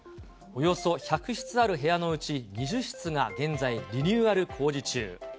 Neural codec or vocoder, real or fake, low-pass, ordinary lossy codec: none; real; none; none